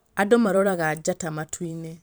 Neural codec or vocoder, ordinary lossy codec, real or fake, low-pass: vocoder, 44.1 kHz, 128 mel bands every 512 samples, BigVGAN v2; none; fake; none